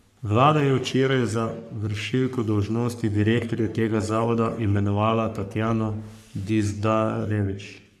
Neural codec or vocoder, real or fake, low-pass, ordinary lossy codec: codec, 44.1 kHz, 3.4 kbps, Pupu-Codec; fake; 14.4 kHz; AAC, 96 kbps